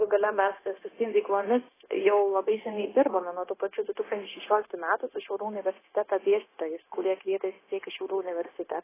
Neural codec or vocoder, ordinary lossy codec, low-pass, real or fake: codec, 16 kHz, 0.9 kbps, LongCat-Audio-Codec; AAC, 16 kbps; 3.6 kHz; fake